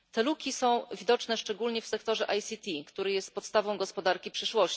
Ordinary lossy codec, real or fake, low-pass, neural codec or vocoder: none; real; none; none